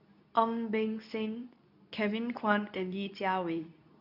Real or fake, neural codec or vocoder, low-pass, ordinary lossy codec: fake; codec, 24 kHz, 0.9 kbps, WavTokenizer, medium speech release version 2; 5.4 kHz; none